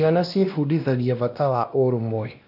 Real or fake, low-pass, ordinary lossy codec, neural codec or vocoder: fake; 5.4 kHz; none; codec, 16 kHz, 1 kbps, X-Codec, WavLM features, trained on Multilingual LibriSpeech